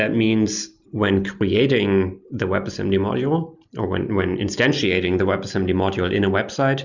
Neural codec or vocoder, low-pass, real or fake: none; 7.2 kHz; real